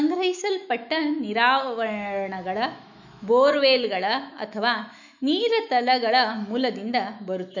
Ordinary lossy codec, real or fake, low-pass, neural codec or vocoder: none; fake; 7.2 kHz; autoencoder, 48 kHz, 128 numbers a frame, DAC-VAE, trained on Japanese speech